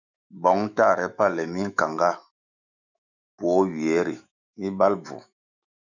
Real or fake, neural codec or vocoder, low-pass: fake; autoencoder, 48 kHz, 128 numbers a frame, DAC-VAE, trained on Japanese speech; 7.2 kHz